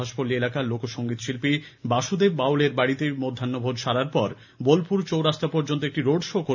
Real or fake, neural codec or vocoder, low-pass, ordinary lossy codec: real; none; 7.2 kHz; none